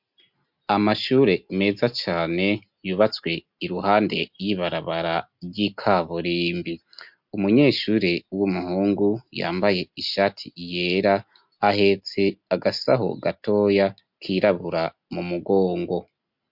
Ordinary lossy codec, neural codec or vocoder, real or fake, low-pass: MP3, 48 kbps; none; real; 5.4 kHz